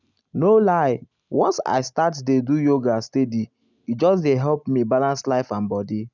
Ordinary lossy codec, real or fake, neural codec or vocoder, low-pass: none; real; none; 7.2 kHz